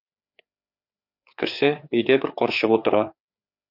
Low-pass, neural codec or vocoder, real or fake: 5.4 kHz; codec, 16 kHz, 4 kbps, FreqCodec, larger model; fake